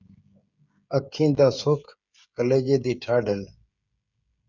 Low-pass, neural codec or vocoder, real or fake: 7.2 kHz; codec, 16 kHz, 16 kbps, FreqCodec, smaller model; fake